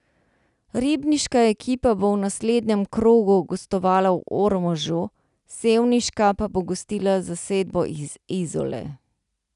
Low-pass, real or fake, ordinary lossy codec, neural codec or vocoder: 10.8 kHz; real; none; none